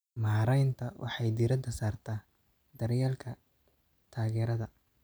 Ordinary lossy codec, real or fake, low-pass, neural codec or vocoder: none; real; none; none